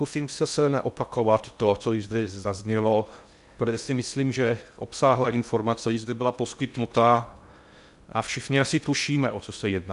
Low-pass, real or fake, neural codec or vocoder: 10.8 kHz; fake; codec, 16 kHz in and 24 kHz out, 0.6 kbps, FocalCodec, streaming, 2048 codes